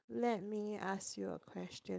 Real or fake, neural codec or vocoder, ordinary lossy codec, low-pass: fake; codec, 16 kHz, 4.8 kbps, FACodec; none; none